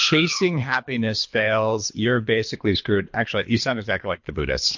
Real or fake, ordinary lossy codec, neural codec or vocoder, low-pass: fake; MP3, 48 kbps; codec, 24 kHz, 3 kbps, HILCodec; 7.2 kHz